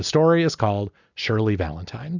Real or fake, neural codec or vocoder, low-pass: real; none; 7.2 kHz